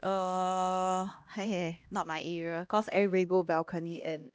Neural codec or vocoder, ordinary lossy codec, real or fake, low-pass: codec, 16 kHz, 1 kbps, X-Codec, HuBERT features, trained on LibriSpeech; none; fake; none